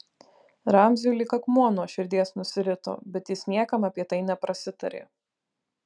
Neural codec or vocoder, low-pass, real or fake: none; 9.9 kHz; real